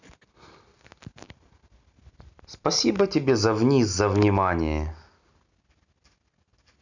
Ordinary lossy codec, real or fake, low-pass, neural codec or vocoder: none; real; 7.2 kHz; none